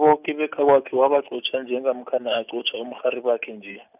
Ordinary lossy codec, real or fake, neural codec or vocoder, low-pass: none; real; none; 3.6 kHz